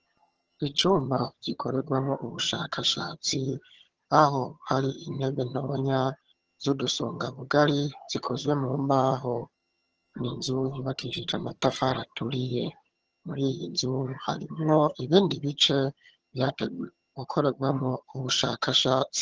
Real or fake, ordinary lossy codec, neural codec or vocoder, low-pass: fake; Opus, 16 kbps; vocoder, 22.05 kHz, 80 mel bands, HiFi-GAN; 7.2 kHz